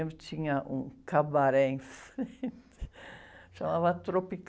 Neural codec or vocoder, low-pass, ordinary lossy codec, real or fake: none; none; none; real